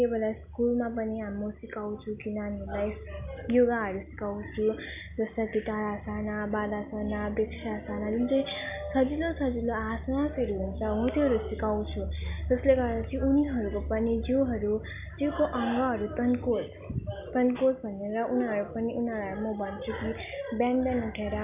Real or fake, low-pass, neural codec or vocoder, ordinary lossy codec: real; 3.6 kHz; none; none